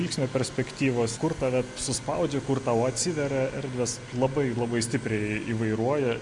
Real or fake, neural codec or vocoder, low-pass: real; none; 10.8 kHz